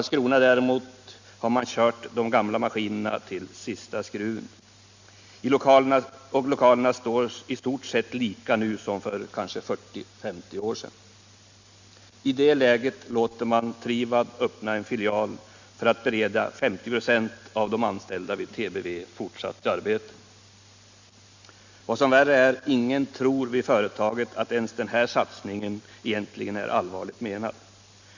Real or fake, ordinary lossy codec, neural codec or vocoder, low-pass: real; Opus, 64 kbps; none; 7.2 kHz